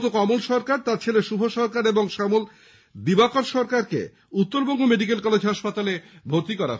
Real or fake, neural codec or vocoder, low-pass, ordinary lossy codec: real; none; none; none